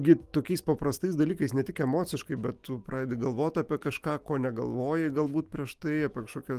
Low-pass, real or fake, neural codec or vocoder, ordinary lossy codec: 14.4 kHz; fake; autoencoder, 48 kHz, 128 numbers a frame, DAC-VAE, trained on Japanese speech; Opus, 24 kbps